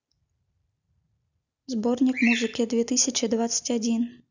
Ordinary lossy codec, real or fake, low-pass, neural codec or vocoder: none; real; 7.2 kHz; none